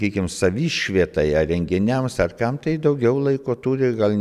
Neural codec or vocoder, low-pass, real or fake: none; 14.4 kHz; real